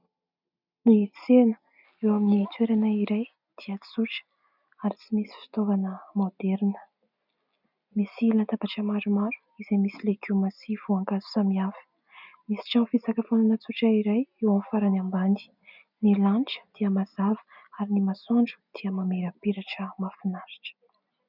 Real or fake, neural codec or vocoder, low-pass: real; none; 5.4 kHz